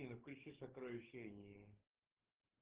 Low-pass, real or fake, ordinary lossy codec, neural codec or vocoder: 3.6 kHz; fake; Opus, 16 kbps; codec, 44.1 kHz, 7.8 kbps, DAC